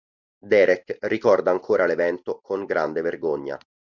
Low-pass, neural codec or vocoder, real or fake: 7.2 kHz; none; real